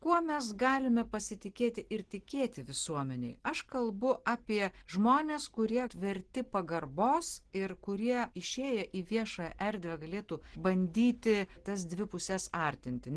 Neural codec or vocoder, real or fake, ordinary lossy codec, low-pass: none; real; Opus, 16 kbps; 10.8 kHz